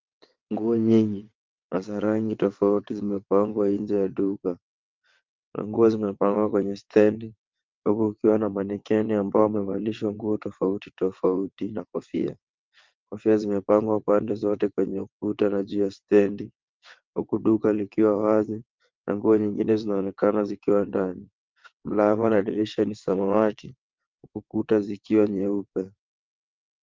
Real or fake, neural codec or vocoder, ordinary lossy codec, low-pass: fake; vocoder, 22.05 kHz, 80 mel bands, WaveNeXt; Opus, 24 kbps; 7.2 kHz